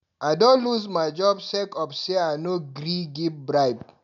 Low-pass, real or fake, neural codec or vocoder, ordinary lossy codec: 7.2 kHz; real; none; none